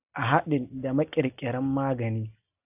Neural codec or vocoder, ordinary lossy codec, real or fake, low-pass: none; AAC, 32 kbps; real; 3.6 kHz